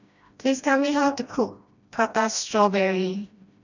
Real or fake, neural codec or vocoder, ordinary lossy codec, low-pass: fake; codec, 16 kHz, 1 kbps, FreqCodec, smaller model; none; 7.2 kHz